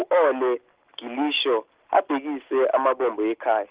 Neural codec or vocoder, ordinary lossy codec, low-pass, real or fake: none; Opus, 16 kbps; 3.6 kHz; real